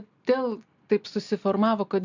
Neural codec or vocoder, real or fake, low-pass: none; real; 7.2 kHz